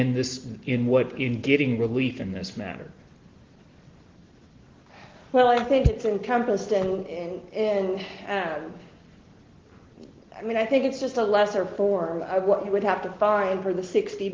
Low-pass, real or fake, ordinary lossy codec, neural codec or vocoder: 7.2 kHz; real; Opus, 16 kbps; none